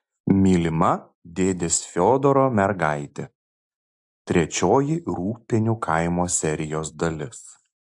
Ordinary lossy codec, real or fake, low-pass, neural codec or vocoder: AAC, 64 kbps; real; 10.8 kHz; none